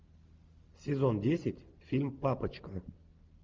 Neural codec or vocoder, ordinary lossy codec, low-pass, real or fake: none; Opus, 32 kbps; 7.2 kHz; real